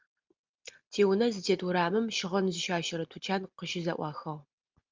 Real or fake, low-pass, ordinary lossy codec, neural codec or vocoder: real; 7.2 kHz; Opus, 32 kbps; none